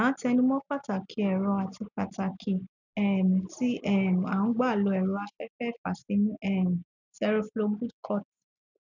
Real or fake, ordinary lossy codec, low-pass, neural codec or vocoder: real; none; 7.2 kHz; none